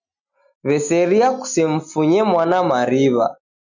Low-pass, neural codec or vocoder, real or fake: 7.2 kHz; none; real